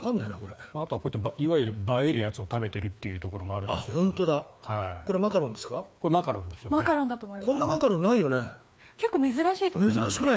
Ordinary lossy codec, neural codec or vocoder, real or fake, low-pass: none; codec, 16 kHz, 2 kbps, FreqCodec, larger model; fake; none